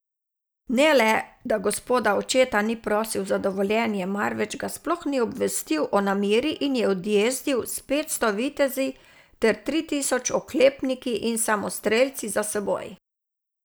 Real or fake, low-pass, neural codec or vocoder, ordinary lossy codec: real; none; none; none